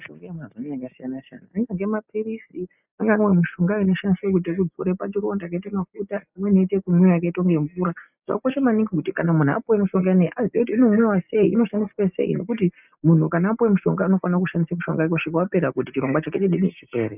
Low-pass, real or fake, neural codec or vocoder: 3.6 kHz; real; none